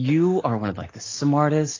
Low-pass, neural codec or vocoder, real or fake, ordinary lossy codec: 7.2 kHz; none; real; AAC, 32 kbps